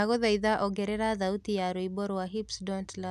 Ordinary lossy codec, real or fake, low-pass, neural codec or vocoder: none; real; 14.4 kHz; none